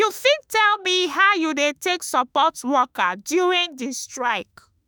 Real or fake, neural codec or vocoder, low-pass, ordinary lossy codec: fake; autoencoder, 48 kHz, 32 numbers a frame, DAC-VAE, trained on Japanese speech; none; none